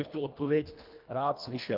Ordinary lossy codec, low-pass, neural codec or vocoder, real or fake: Opus, 24 kbps; 5.4 kHz; codec, 24 kHz, 1.5 kbps, HILCodec; fake